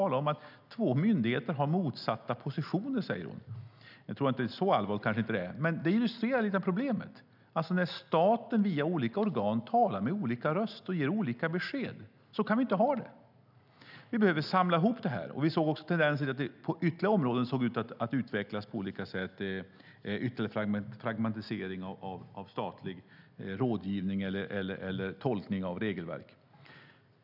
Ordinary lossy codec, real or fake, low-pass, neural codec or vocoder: none; real; 5.4 kHz; none